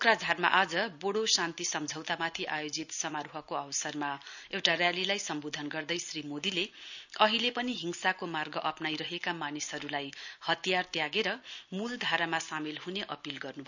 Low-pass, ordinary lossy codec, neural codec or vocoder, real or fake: 7.2 kHz; none; none; real